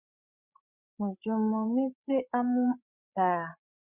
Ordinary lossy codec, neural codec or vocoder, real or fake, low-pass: Opus, 64 kbps; codec, 16 kHz, 8 kbps, FreqCodec, larger model; fake; 3.6 kHz